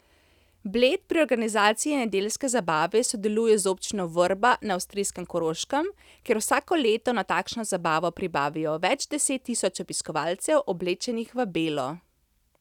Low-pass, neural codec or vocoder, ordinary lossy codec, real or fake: 19.8 kHz; none; none; real